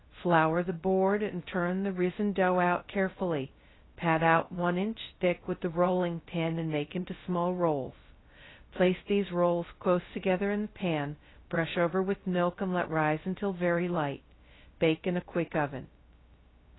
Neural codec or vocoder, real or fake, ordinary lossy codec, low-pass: codec, 16 kHz, 0.2 kbps, FocalCodec; fake; AAC, 16 kbps; 7.2 kHz